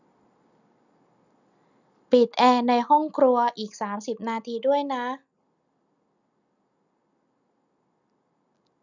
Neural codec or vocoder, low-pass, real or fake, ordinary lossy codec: none; 7.2 kHz; real; none